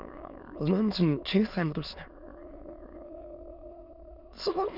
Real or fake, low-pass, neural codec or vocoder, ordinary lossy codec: fake; 5.4 kHz; autoencoder, 22.05 kHz, a latent of 192 numbers a frame, VITS, trained on many speakers; Opus, 64 kbps